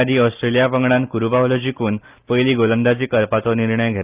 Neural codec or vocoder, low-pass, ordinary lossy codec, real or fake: none; 3.6 kHz; Opus, 32 kbps; real